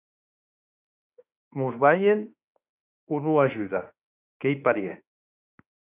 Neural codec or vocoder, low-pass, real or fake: autoencoder, 48 kHz, 32 numbers a frame, DAC-VAE, trained on Japanese speech; 3.6 kHz; fake